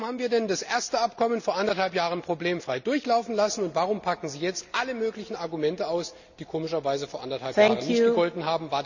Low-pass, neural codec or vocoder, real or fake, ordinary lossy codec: 7.2 kHz; none; real; none